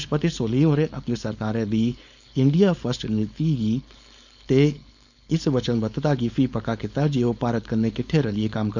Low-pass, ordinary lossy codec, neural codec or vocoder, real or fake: 7.2 kHz; none; codec, 16 kHz, 4.8 kbps, FACodec; fake